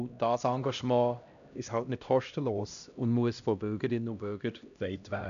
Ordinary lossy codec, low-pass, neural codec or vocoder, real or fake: none; 7.2 kHz; codec, 16 kHz, 1 kbps, X-Codec, HuBERT features, trained on LibriSpeech; fake